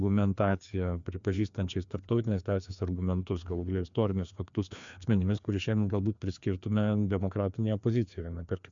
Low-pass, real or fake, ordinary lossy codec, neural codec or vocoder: 7.2 kHz; fake; MP3, 64 kbps; codec, 16 kHz, 2 kbps, FreqCodec, larger model